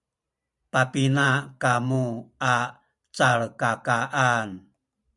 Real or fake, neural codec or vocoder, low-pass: fake; vocoder, 44.1 kHz, 128 mel bands every 512 samples, BigVGAN v2; 10.8 kHz